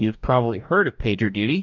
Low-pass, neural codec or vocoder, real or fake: 7.2 kHz; codec, 44.1 kHz, 2.6 kbps, DAC; fake